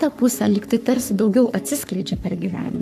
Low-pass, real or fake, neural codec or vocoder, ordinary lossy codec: 14.4 kHz; fake; codec, 44.1 kHz, 3.4 kbps, Pupu-Codec; AAC, 96 kbps